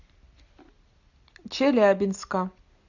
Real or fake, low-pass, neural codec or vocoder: real; 7.2 kHz; none